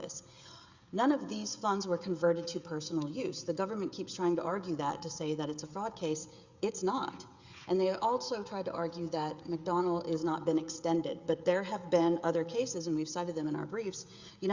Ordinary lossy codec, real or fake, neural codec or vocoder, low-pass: Opus, 64 kbps; fake; codec, 16 kHz, 16 kbps, FreqCodec, smaller model; 7.2 kHz